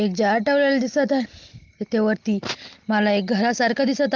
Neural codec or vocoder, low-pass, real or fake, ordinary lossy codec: none; 7.2 kHz; real; Opus, 32 kbps